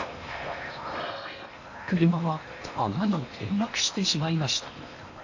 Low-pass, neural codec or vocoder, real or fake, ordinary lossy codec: 7.2 kHz; codec, 16 kHz in and 24 kHz out, 0.8 kbps, FocalCodec, streaming, 65536 codes; fake; AAC, 48 kbps